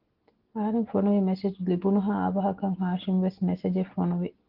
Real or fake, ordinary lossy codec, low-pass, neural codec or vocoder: real; Opus, 16 kbps; 5.4 kHz; none